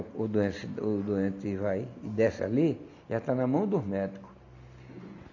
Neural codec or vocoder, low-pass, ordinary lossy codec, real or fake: none; 7.2 kHz; none; real